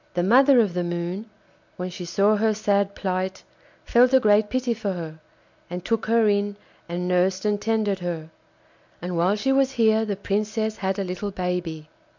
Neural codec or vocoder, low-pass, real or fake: none; 7.2 kHz; real